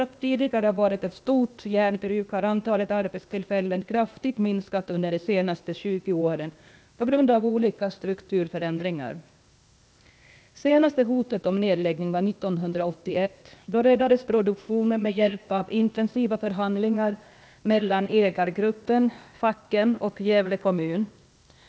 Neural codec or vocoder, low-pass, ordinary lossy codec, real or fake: codec, 16 kHz, 0.8 kbps, ZipCodec; none; none; fake